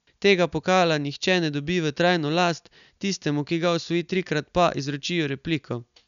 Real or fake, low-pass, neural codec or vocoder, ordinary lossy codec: real; 7.2 kHz; none; none